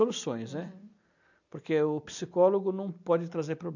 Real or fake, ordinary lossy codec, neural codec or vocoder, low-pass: real; none; none; 7.2 kHz